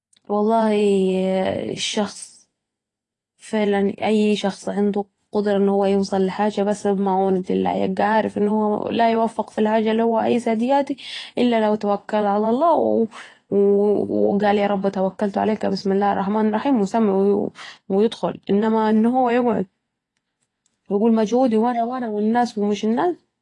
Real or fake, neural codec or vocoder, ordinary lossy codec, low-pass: fake; vocoder, 24 kHz, 100 mel bands, Vocos; AAC, 32 kbps; 10.8 kHz